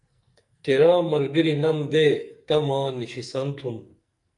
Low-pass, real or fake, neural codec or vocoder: 10.8 kHz; fake; codec, 44.1 kHz, 2.6 kbps, SNAC